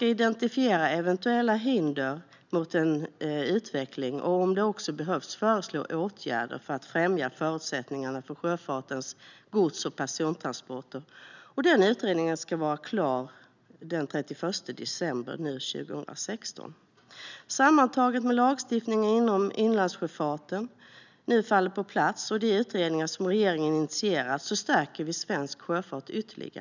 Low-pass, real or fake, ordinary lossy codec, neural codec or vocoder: 7.2 kHz; real; none; none